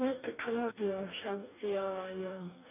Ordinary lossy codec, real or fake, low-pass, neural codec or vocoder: none; fake; 3.6 kHz; codec, 44.1 kHz, 2.6 kbps, DAC